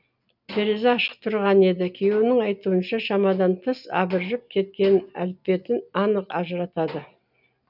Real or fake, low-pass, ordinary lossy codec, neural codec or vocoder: real; 5.4 kHz; none; none